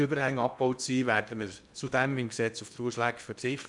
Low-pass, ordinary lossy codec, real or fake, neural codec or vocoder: 10.8 kHz; none; fake; codec, 16 kHz in and 24 kHz out, 0.8 kbps, FocalCodec, streaming, 65536 codes